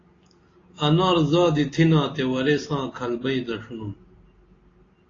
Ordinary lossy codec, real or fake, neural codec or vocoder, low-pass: AAC, 32 kbps; real; none; 7.2 kHz